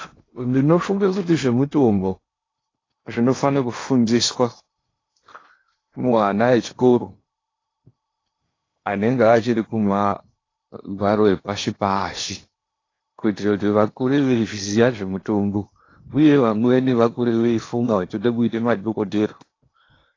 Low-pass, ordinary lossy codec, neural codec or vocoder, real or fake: 7.2 kHz; AAC, 32 kbps; codec, 16 kHz in and 24 kHz out, 0.8 kbps, FocalCodec, streaming, 65536 codes; fake